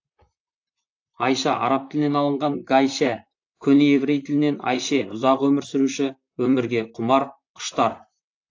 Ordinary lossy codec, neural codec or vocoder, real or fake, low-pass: AAC, 48 kbps; vocoder, 44.1 kHz, 128 mel bands, Pupu-Vocoder; fake; 7.2 kHz